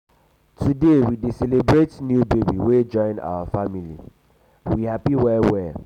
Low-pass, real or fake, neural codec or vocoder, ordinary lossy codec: 19.8 kHz; real; none; none